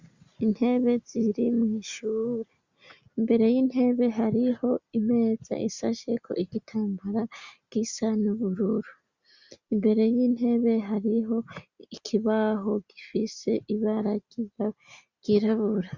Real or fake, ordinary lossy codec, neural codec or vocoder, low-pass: real; Opus, 64 kbps; none; 7.2 kHz